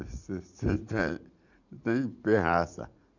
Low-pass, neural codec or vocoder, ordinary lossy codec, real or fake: 7.2 kHz; vocoder, 44.1 kHz, 80 mel bands, Vocos; none; fake